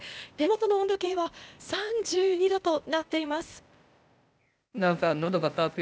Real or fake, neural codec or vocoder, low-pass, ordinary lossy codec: fake; codec, 16 kHz, 0.8 kbps, ZipCodec; none; none